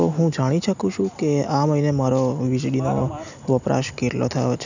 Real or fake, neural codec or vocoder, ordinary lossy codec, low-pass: real; none; none; 7.2 kHz